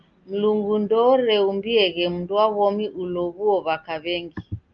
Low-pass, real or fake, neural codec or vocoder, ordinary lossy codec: 7.2 kHz; real; none; Opus, 32 kbps